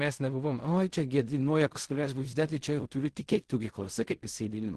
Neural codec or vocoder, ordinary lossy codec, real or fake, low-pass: codec, 16 kHz in and 24 kHz out, 0.4 kbps, LongCat-Audio-Codec, fine tuned four codebook decoder; Opus, 16 kbps; fake; 10.8 kHz